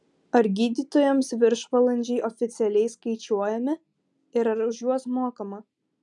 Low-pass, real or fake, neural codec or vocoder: 10.8 kHz; real; none